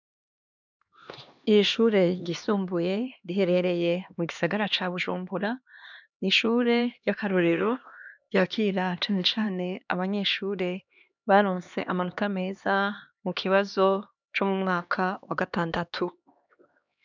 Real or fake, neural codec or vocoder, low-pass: fake; codec, 16 kHz, 2 kbps, X-Codec, HuBERT features, trained on LibriSpeech; 7.2 kHz